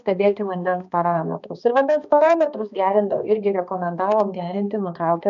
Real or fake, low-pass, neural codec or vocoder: fake; 7.2 kHz; codec, 16 kHz, 2 kbps, X-Codec, HuBERT features, trained on balanced general audio